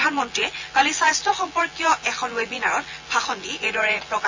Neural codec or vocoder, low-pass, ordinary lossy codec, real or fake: vocoder, 24 kHz, 100 mel bands, Vocos; 7.2 kHz; AAC, 48 kbps; fake